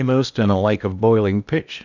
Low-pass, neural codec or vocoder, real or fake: 7.2 kHz; codec, 16 kHz in and 24 kHz out, 0.8 kbps, FocalCodec, streaming, 65536 codes; fake